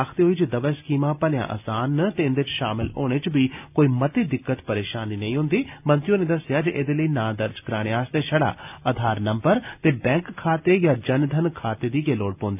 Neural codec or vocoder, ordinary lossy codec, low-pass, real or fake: none; none; 3.6 kHz; real